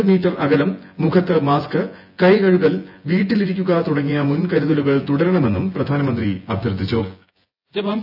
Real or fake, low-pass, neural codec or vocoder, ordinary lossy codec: fake; 5.4 kHz; vocoder, 24 kHz, 100 mel bands, Vocos; AAC, 48 kbps